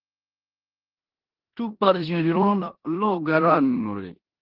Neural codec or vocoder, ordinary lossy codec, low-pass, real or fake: codec, 16 kHz in and 24 kHz out, 0.9 kbps, LongCat-Audio-Codec, four codebook decoder; Opus, 16 kbps; 5.4 kHz; fake